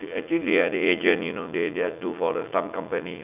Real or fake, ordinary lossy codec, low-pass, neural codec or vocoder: fake; none; 3.6 kHz; vocoder, 44.1 kHz, 80 mel bands, Vocos